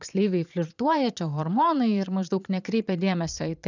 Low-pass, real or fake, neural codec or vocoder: 7.2 kHz; fake; vocoder, 22.05 kHz, 80 mel bands, Vocos